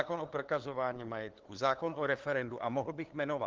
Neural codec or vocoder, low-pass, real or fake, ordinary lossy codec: codec, 16 kHz, 2 kbps, FunCodec, trained on LibriTTS, 25 frames a second; 7.2 kHz; fake; Opus, 16 kbps